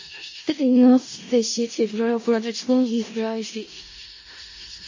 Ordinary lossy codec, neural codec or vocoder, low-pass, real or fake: MP3, 32 kbps; codec, 16 kHz in and 24 kHz out, 0.4 kbps, LongCat-Audio-Codec, four codebook decoder; 7.2 kHz; fake